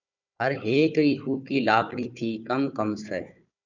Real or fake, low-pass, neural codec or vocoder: fake; 7.2 kHz; codec, 16 kHz, 4 kbps, FunCodec, trained on Chinese and English, 50 frames a second